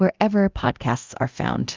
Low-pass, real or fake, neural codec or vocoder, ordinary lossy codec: 7.2 kHz; fake; codec, 24 kHz, 0.9 kbps, DualCodec; Opus, 32 kbps